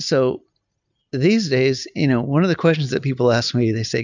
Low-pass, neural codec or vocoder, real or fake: 7.2 kHz; none; real